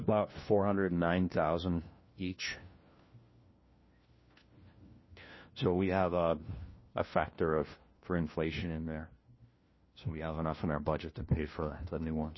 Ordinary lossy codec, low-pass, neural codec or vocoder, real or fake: MP3, 24 kbps; 7.2 kHz; codec, 16 kHz, 1 kbps, FunCodec, trained on LibriTTS, 50 frames a second; fake